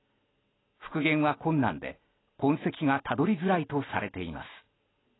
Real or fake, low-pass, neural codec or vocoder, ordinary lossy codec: real; 7.2 kHz; none; AAC, 16 kbps